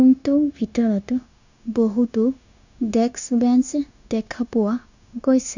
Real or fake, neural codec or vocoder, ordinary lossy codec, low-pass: fake; codec, 16 kHz, 0.9 kbps, LongCat-Audio-Codec; none; 7.2 kHz